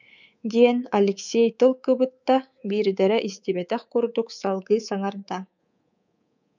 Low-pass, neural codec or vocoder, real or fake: 7.2 kHz; codec, 24 kHz, 3.1 kbps, DualCodec; fake